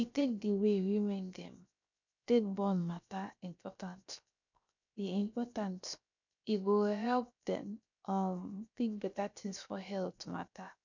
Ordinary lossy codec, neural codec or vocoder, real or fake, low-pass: none; codec, 16 kHz, 0.7 kbps, FocalCodec; fake; 7.2 kHz